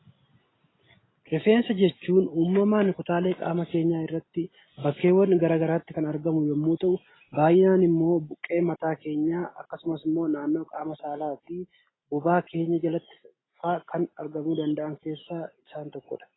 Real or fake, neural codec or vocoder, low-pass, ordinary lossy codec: real; none; 7.2 kHz; AAC, 16 kbps